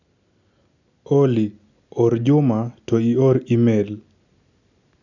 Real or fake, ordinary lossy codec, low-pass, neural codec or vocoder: real; none; 7.2 kHz; none